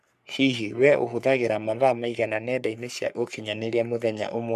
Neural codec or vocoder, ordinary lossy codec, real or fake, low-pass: codec, 44.1 kHz, 3.4 kbps, Pupu-Codec; none; fake; 14.4 kHz